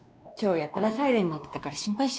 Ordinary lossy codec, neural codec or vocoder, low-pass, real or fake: none; codec, 16 kHz, 2 kbps, X-Codec, WavLM features, trained on Multilingual LibriSpeech; none; fake